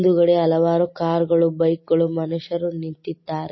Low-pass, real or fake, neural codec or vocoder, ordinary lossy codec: 7.2 kHz; real; none; MP3, 24 kbps